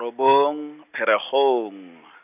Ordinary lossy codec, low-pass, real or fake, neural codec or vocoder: none; 3.6 kHz; real; none